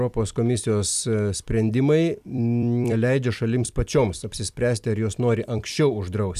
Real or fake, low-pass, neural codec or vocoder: fake; 14.4 kHz; vocoder, 44.1 kHz, 128 mel bands every 256 samples, BigVGAN v2